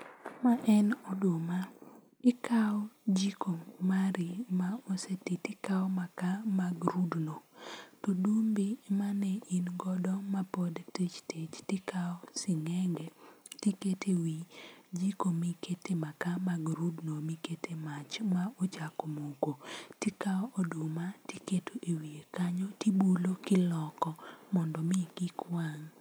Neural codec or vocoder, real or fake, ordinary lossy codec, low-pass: none; real; none; none